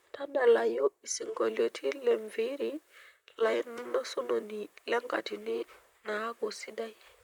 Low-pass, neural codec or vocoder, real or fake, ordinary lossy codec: 19.8 kHz; vocoder, 44.1 kHz, 128 mel bands, Pupu-Vocoder; fake; none